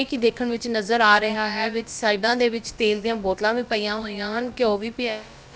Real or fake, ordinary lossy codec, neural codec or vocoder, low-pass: fake; none; codec, 16 kHz, about 1 kbps, DyCAST, with the encoder's durations; none